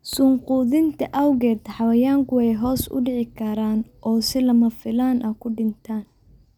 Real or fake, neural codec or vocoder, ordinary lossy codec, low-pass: real; none; none; 19.8 kHz